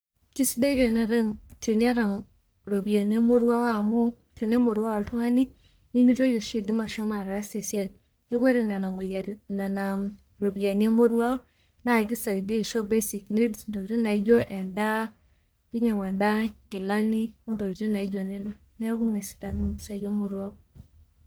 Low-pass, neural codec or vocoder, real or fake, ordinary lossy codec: none; codec, 44.1 kHz, 1.7 kbps, Pupu-Codec; fake; none